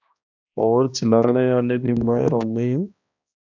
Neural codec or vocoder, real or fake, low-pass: codec, 16 kHz, 1 kbps, X-Codec, HuBERT features, trained on balanced general audio; fake; 7.2 kHz